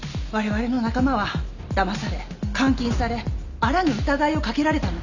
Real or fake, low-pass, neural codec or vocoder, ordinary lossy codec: real; 7.2 kHz; none; none